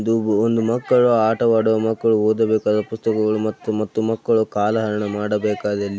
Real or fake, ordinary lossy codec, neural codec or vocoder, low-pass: real; none; none; none